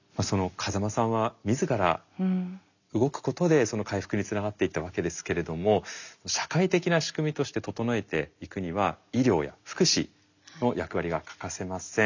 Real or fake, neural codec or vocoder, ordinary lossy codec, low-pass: real; none; none; 7.2 kHz